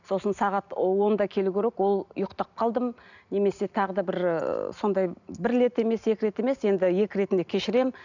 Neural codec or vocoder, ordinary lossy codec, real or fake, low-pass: none; none; real; 7.2 kHz